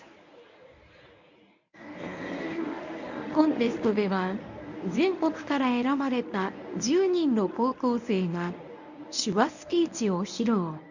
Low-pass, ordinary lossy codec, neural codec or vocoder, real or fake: 7.2 kHz; none; codec, 24 kHz, 0.9 kbps, WavTokenizer, medium speech release version 1; fake